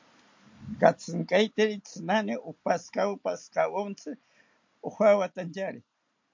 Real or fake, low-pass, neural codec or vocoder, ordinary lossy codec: real; 7.2 kHz; none; MP3, 48 kbps